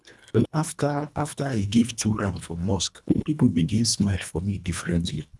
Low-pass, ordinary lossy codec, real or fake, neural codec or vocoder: none; none; fake; codec, 24 kHz, 1.5 kbps, HILCodec